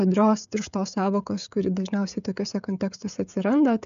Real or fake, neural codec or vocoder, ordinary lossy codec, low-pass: fake; codec, 16 kHz, 16 kbps, FreqCodec, smaller model; AAC, 96 kbps; 7.2 kHz